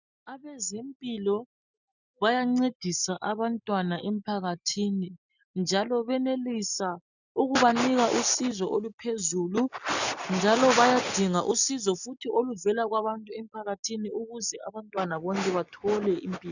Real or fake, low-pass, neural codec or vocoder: real; 7.2 kHz; none